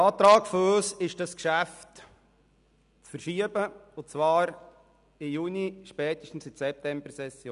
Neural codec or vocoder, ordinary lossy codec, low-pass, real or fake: none; none; 10.8 kHz; real